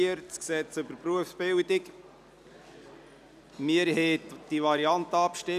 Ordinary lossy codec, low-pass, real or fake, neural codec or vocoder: none; 14.4 kHz; real; none